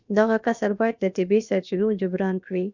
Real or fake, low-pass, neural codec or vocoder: fake; 7.2 kHz; codec, 16 kHz, about 1 kbps, DyCAST, with the encoder's durations